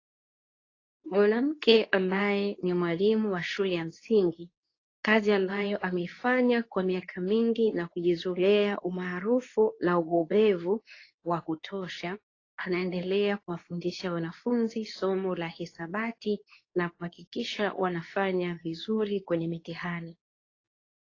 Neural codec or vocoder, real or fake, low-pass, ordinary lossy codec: codec, 24 kHz, 0.9 kbps, WavTokenizer, medium speech release version 2; fake; 7.2 kHz; AAC, 32 kbps